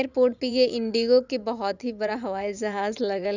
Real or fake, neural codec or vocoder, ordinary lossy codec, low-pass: fake; vocoder, 44.1 kHz, 80 mel bands, Vocos; none; 7.2 kHz